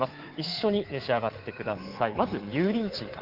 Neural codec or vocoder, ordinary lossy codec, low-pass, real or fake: codec, 24 kHz, 3.1 kbps, DualCodec; Opus, 16 kbps; 5.4 kHz; fake